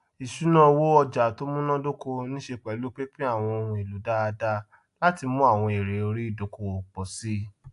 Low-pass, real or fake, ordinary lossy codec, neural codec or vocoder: 10.8 kHz; real; AAC, 64 kbps; none